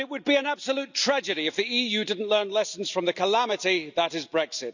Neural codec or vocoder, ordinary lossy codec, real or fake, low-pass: none; none; real; 7.2 kHz